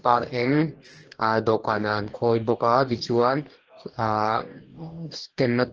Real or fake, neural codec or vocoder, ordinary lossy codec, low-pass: fake; codec, 44.1 kHz, 2.6 kbps, DAC; Opus, 16 kbps; 7.2 kHz